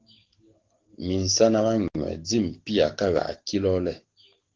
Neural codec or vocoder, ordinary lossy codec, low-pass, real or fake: codec, 44.1 kHz, 7.8 kbps, DAC; Opus, 16 kbps; 7.2 kHz; fake